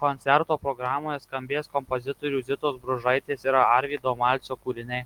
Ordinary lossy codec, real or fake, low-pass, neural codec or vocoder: Opus, 24 kbps; real; 19.8 kHz; none